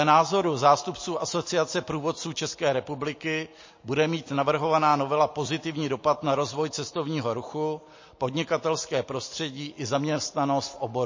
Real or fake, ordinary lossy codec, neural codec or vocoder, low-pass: real; MP3, 32 kbps; none; 7.2 kHz